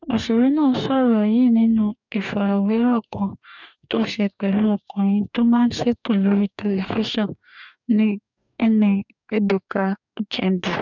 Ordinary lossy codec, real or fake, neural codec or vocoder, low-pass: none; fake; codec, 44.1 kHz, 2.6 kbps, DAC; 7.2 kHz